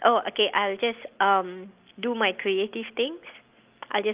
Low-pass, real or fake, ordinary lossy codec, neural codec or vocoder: 3.6 kHz; real; Opus, 32 kbps; none